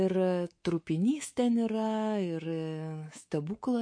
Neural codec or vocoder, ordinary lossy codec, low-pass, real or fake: codec, 24 kHz, 3.1 kbps, DualCodec; MP3, 48 kbps; 9.9 kHz; fake